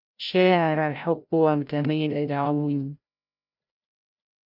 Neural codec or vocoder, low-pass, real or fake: codec, 16 kHz, 0.5 kbps, FreqCodec, larger model; 5.4 kHz; fake